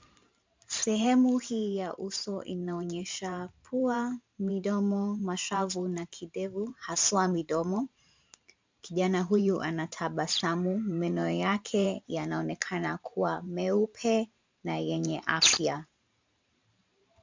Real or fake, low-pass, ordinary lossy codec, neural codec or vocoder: fake; 7.2 kHz; MP3, 64 kbps; vocoder, 44.1 kHz, 128 mel bands every 512 samples, BigVGAN v2